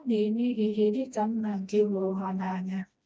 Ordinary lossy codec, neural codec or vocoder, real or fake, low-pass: none; codec, 16 kHz, 1 kbps, FreqCodec, smaller model; fake; none